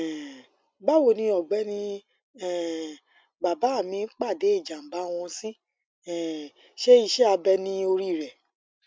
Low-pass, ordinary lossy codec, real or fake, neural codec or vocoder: none; none; real; none